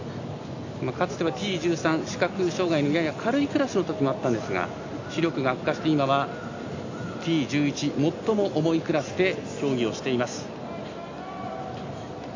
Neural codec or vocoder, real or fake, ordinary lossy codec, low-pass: none; real; AAC, 48 kbps; 7.2 kHz